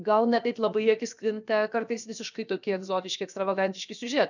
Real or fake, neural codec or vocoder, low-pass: fake; codec, 16 kHz, 0.7 kbps, FocalCodec; 7.2 kHz